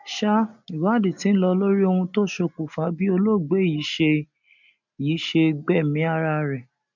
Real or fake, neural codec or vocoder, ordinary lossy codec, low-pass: real; none; none; 7.2 kHz